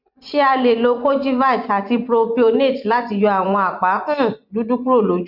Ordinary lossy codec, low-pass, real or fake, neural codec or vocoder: none; 5.4 kHz; fake; vocoder, 24 kHz, 100 mel bands, Vocos